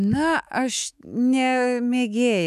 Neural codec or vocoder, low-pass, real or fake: autoencoder, 48 kHz, 128 numbers a frame, DAC-VAE, trained on Japanese speech; 14.4 kHz; fake